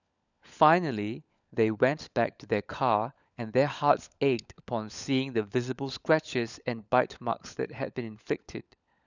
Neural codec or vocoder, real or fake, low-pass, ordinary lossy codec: codec, 16 kHz, 16 kbps, FunCodec, trained on LibriTTS, 50 frames a second; fake; 7.2 kHz; none